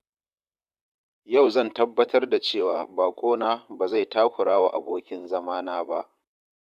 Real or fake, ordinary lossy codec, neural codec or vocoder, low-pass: fake; none; vocoder, 44.1 kHz, 128 mel bands, Pupu-Vocoder; 14.4 kHz